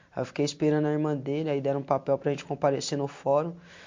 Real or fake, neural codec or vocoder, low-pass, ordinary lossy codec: real; none; 7.2 kHz; MP3, 48 kbps